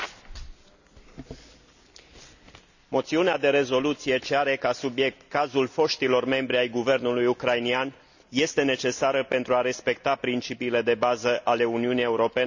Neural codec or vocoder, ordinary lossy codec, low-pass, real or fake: none; none; 7.2 kHz; real